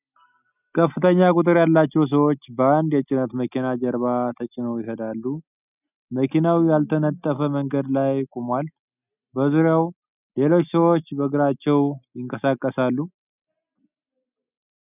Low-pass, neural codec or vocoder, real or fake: 3.6 kHz; none; real